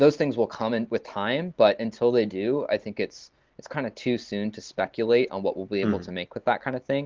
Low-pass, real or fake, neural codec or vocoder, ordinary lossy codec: 7.2 kHz; real; none; Opus, 16 kbps